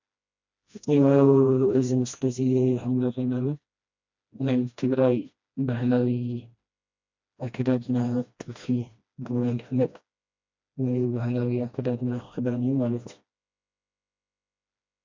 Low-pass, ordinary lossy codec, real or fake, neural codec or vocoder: 7.2 kHz; MP3, 64 kbps; fake; codec, 16 kHz, 1 kbps, FreqCodec, smaller model